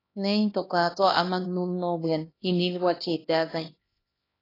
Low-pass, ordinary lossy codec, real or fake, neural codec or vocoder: 5.4 kHz; AAC, 24 kbps; fake; codec, 16 kHz, 1 kbps, X-Codec, HuBERT features, trained on LibriSpeech